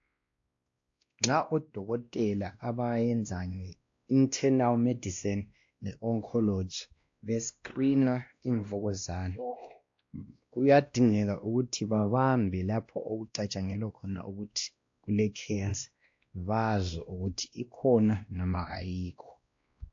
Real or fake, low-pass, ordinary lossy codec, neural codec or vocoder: fake; 7.2 kHz; AAC, 48 kbps; codec, 16 kHz, 1 kbps, X-Codec, WavLM features, trained on Multilingual LibriSpeech